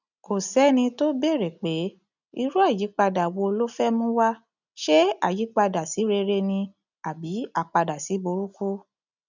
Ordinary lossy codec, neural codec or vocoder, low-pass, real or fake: none; none; 7.2 kHz; real